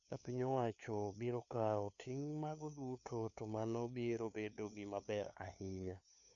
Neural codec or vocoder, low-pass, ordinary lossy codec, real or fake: codec, 16 kHz, 2 kbps, FunCodec, trained on LibriTTS, 25 frames a second; 7.2 kHz; none; fake